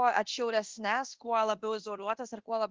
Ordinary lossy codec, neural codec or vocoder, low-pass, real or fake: Opus, 16 kbps; codec, 24 kHz, 0.9 kbps, DualCodec; 7.2 kHz; fake